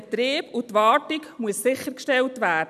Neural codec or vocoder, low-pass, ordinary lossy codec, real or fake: none; 14.4 kHz; none; real